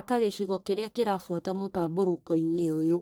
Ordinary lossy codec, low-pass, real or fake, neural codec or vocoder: none; none; fake; codec, 44.1 kHz, 1.7 kbps, Pupu-Codec